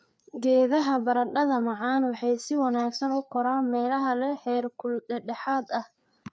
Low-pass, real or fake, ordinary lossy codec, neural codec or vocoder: none; fake; none; codec, 16 kHz, 4 kbps, FreqCodec, larger model